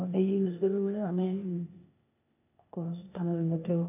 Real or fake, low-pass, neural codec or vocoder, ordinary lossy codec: fake; 3.6 kHz; codec, 16 kHz, 0.8 kbps, ZipCodec; none